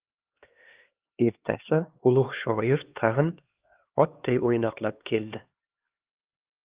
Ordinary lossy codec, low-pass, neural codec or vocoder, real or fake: Opus, 32 kbps; 3.6 kHz; codec, 16 kHz, 2 kbps, X-Codec, HuBERT features, trained on LibriSpeech; fake